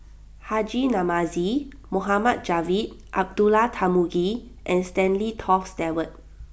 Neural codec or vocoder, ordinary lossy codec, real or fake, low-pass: none; none; real; none